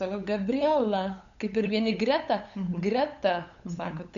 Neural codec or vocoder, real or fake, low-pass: codec, 16 kHz, 8 kbps, FunCodec, trained on LibriTTS, 25 frames a second; fake; 7.2 kHz